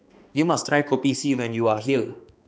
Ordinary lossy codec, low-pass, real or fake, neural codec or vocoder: none; none; fake; codec, 16 kHz, 2 kbps, X-Codec, HuBERT features, trained on balanced general audio